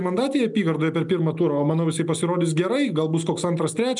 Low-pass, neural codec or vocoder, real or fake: 10.8 kHz; none; real